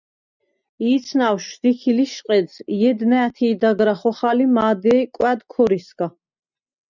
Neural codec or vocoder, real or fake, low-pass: none; real; 7.2 kHz